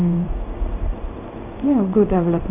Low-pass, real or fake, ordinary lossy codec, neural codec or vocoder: 3.6 kHz; fake; none; codec, 16 kHz in and 24 kHz out, 1 kbps, XY-Tokenizer